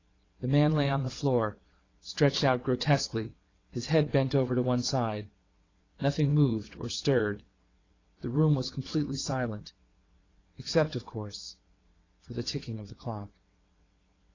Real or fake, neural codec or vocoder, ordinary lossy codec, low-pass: fake; vocoder, 22.05 kHz, 80 mel bands, WaveNeXt; AAC, 32 kbps; 7.2 kHz